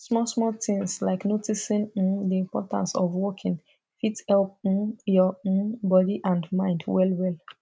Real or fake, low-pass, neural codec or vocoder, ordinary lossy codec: real; none; none; none